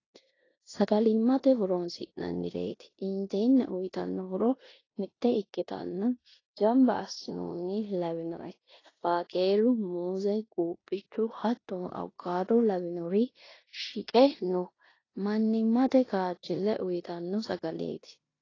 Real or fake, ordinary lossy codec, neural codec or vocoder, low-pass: fake; AAC, 32 kbps; codec, 16 kHz in and 24 kHz out, 0.9 kbps, LongCat-Audio-Codec, four codebook decoder; 7.2 kHz